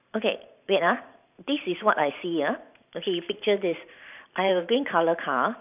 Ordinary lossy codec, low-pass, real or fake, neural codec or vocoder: none; 3.6 kHz; fake; vocoder, 44.1 kHz, 128 mel bands every 512 samples, BigVGAN v2